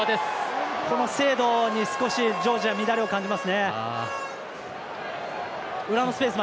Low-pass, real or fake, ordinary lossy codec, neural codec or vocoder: none; real; none; none